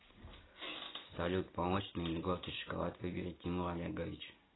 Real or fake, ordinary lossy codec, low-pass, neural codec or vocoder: real; AAC, 16 kbps; 7.2 kHz; none